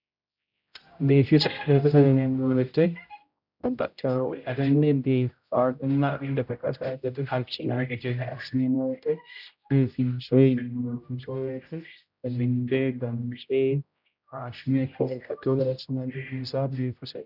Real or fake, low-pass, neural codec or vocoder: fake; 5.4 kHz; codec, 16 kHz, 0.5 kbps, X-Codec, HuBERT features, trained on general audio